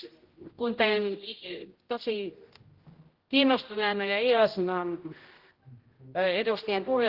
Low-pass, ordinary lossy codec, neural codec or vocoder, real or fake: 5.4 kHz; Opus, 16 kbps; codec, 16 kHz, 0.5 kbps, X-Codec, HuBERT features, trained on general audio; fake